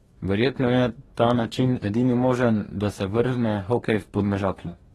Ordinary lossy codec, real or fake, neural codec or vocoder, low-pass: AAC, 32 kbps; fake; codec, 44.1 kHz, 2.6 kbps, DAC; 19.8 kHz